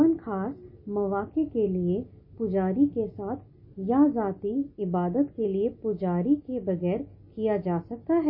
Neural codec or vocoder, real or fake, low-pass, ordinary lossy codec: none; real; 5.4 kHz; MP3, 24 kbps